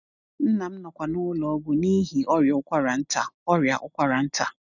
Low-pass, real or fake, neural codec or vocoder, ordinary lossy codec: 7.2 kHz; real; none; none